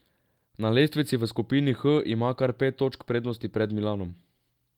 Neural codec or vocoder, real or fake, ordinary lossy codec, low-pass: none; real; Opus, 32 kbps; 19.8 kHz